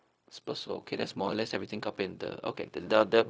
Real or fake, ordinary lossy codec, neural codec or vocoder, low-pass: fake; none; codec, 16 kHz, 0.4 kbps, LongCat-Audio-Codec; none